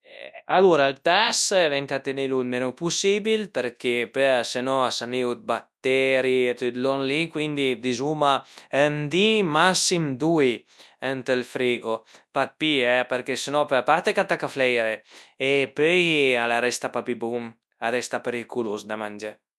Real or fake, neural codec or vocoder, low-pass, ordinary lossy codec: fake; codec, 24 kHz, 0.9 kbps, WavTokenizer, large speech release; none; none